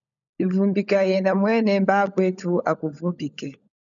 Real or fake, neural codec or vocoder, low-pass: fake; codec, 16 kHz, 16 kbps, FunCodec, trained on LibriTTS, 50 frames a second; 7.2 kHz